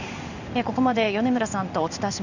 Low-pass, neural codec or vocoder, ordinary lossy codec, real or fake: 7.2 kHz; codec, 16 kHz in and 24 kHz out, 1 kbps, XY-Tokenizer; none; fake